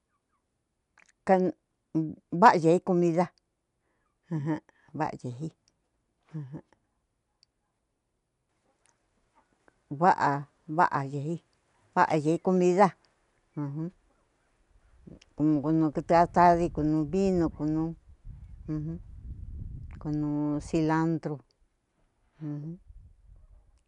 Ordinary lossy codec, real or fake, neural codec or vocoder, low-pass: none; real; none; 10.8 kHz